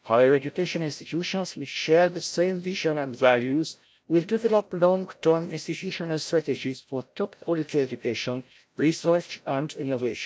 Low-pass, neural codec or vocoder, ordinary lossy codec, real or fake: none; codec, 16 kHz, 0.5 kbps, FreqCodec, larger model; none; fake